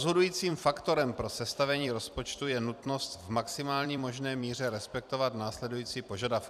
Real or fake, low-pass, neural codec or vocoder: fake; 14.4 kHz; vocoder, 44.1 kHz, 128 mel bands every 256 samples, BigVGAN v2